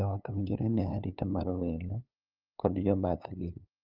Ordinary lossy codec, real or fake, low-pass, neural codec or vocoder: Opus, 24 kbps; fake; 5.4 kHz; codec, 16 kHz, 2 kbps, FunCodec, trained on LibriTTS, 25 frames a second